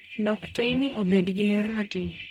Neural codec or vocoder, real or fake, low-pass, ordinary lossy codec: codec, 44.1 kHz, 0.9 kbps, DAC; fake; 19.8 kHz; none